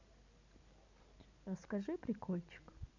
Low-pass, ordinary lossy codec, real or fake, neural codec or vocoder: 7.2 kHz; none; real; none